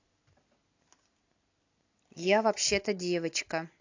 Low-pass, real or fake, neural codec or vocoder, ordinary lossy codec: 7.2 kHz; real; none; AAC, 48 kbps